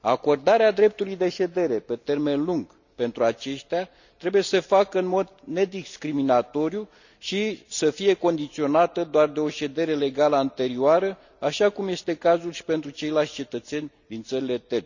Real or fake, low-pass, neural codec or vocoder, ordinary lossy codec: real; 7.2 kHz; none; none